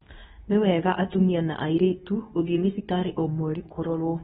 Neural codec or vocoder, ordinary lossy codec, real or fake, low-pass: codec, 24 kHz, 0.9 kbps, WavTokenizer, medium speech release version 2; AAC, 16 kbps; fake; 10.8 kHz